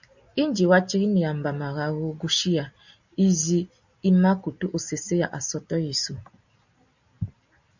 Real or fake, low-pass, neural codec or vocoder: real; 7.2 kHz; none